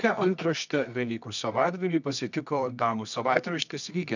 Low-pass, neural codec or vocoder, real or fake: 7.2 kHz; codec, 24 kHz, 0.9 kbps, WavTokenizer, medium music audio release; fake